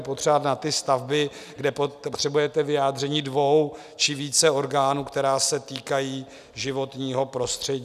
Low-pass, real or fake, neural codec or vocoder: 14.4 kHz; real; none